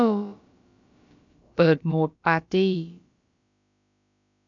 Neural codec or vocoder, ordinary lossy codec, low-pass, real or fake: codec, 16 kHz, about 1 kbps, DyCAST, with the encoder's durations; none; 7.2 kHz; fake